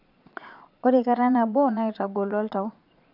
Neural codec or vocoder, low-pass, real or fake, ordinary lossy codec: vocoder, 22.05 kHz, 80 mel bands, Vocos; 5.4 kHz; fake; none